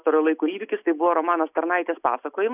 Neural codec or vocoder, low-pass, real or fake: none; 3.6 kHz; real